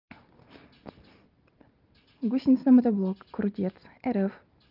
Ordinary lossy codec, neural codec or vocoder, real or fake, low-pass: Opus, 32 kbps; none; real; 5.4 kHz